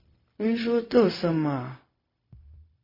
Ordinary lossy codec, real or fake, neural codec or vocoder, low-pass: MP3, 24 kbps; fake; codec, 16 kHz, 0.4 kbps, LongCat-Audio-Codec; 5.4 kHz